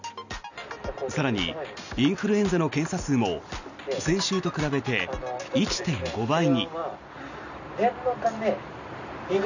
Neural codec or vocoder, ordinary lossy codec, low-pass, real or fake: none; none; 7.2 kHz; real